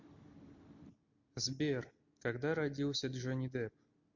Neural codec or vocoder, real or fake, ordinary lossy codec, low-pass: none; real; MP3, 48 kbps; 7.2 kHz